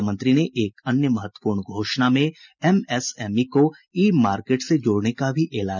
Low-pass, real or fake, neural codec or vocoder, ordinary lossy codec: 7.2 kHz; real; none; none